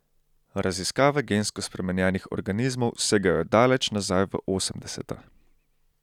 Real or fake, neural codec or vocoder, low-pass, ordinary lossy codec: real; none; 19.8 kHz; none